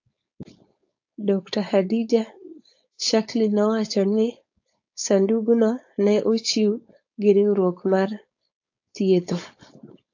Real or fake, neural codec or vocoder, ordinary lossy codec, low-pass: fake; codec, 16 kHz, 4.8 kbps, FACodec; AAC, 48 kbps; 7.2 kHz